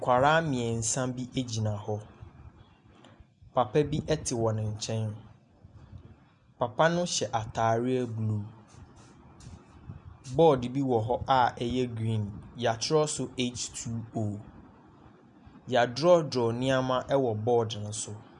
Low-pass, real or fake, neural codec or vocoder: 10.8 kHz; real; none